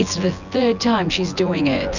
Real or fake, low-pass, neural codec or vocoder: fake; 7.2 kHz; vocoder, 24 kHz, 100 mel bands, Vocos